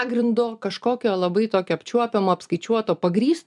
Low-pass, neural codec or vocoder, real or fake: 10.8 kHz; none; real